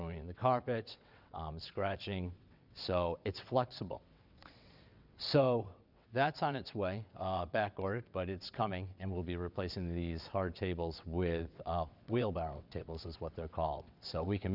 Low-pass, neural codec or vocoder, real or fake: 5.4 kHz; vocoder, 22.05 kHz, 80 mel bands, WaveNeXt; fake